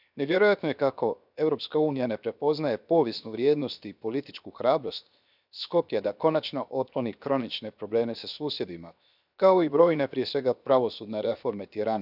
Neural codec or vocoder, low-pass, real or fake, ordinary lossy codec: codec, 16 kHz, 0.7 kbps, FocalCodec; 5.4 kHz; fake; none